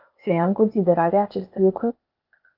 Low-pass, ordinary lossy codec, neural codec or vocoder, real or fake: 5.4 kHz; Opus, 24 kbps; codec, 16 kHz, 0.8 kbps, ZipCodec; fake